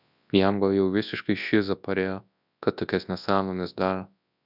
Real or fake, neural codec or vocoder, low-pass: fake; codec, 24 kHz, 0.9 kbps, WavTokenizer, large speech release; 5.4 kHz